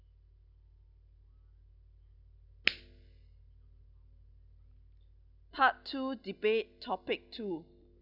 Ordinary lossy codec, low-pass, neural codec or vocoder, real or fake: none; 5.4 kHz; none; real